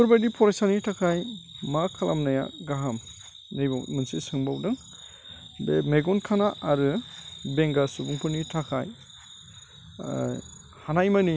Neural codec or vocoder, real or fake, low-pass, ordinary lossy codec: none; real; none; none